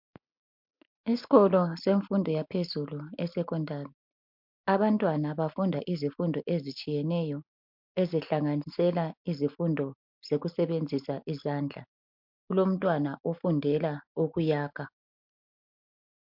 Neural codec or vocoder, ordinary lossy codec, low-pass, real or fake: none; MP3, 48 kbps; 5.4 kHz; real